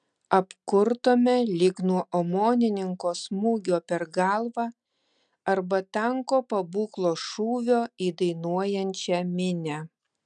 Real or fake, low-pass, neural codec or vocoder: real; 9.9 kHz; none